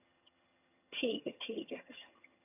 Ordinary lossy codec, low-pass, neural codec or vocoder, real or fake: none; 3.6 kHz; vocoder, 22.05 kHz, 80 mel bands, HiFi-GAN; fake